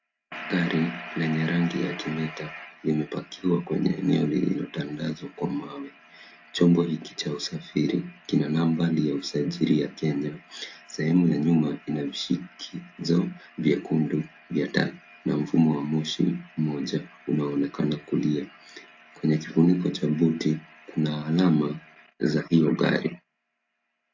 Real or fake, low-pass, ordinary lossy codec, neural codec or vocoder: real; 7.2 kHz; AAC, 48 kbps; none